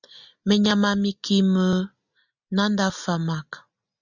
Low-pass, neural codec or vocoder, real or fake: 7.2 kHz; none; real